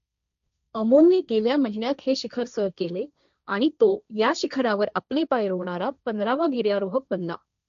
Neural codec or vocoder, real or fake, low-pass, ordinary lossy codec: codec, 16 kHz, 1.1 kbps, Voila-Tokenizer; fake; 7.2 kHz; none